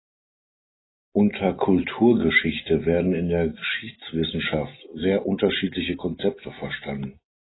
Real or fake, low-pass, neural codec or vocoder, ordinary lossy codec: real; 7.2 kHz; none; AAC, 16 kbps